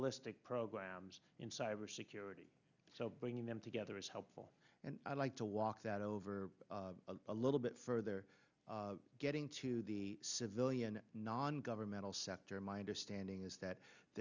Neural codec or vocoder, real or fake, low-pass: none; real; 7.2 kHz